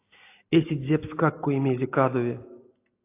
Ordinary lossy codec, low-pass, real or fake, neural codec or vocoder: AAC, 24 kbps; 3.6 kHz; real; none